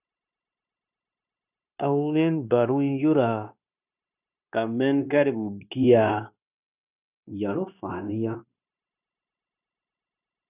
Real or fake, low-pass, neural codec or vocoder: fake; 3.6 kHz; codec, 16 kHz, 0.9 kbps, LongCat-Audio-Codec